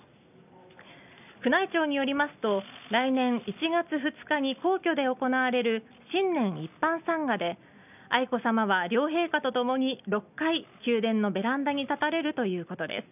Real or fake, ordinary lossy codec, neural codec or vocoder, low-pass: real; none; none; 3.6 kHz